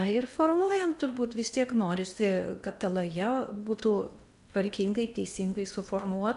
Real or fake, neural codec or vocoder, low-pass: fake; codec, 16 kHz in and 24 kHz out, 0.8 kbps, FocalCodec, streaming, 65536 codes; 10.8 kHz